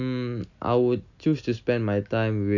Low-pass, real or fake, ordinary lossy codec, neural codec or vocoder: 7.2 kHz; real; none; none